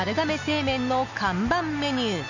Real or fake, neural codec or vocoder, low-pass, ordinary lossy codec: real; none; 7.2 kHz; none